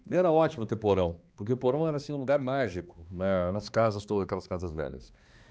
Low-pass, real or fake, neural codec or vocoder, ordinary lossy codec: none; fake; codec, 16 kHz, 2 kbps, X-Codec, HuBERT features, trained on balanced general audio; none